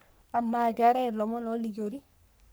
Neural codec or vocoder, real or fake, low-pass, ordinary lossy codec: codec, 44.1 kHz, 3.4 kbps, Pupu-Codec; fake; none; none